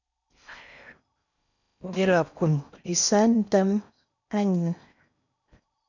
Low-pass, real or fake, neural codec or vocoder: 7.2 kHz; fake; codec, 16 kHz in and 24 kHz out, 0.6 kbps, FocalCodec, streaming, 4096 codes